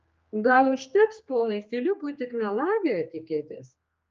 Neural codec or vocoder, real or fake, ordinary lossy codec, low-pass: codec, 16 kHz, 2 kbps, X-Codec, HuBERT features, trained on general audio; fake; Opus, 24 kbps; 7.2 kHz